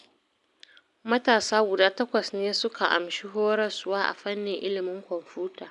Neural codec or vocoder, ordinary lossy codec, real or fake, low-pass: none; none; real; 10.8 kHz